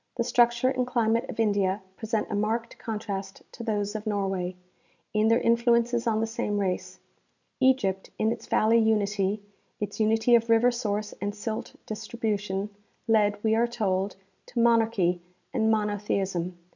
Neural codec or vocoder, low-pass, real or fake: none; 7.2 kHz; real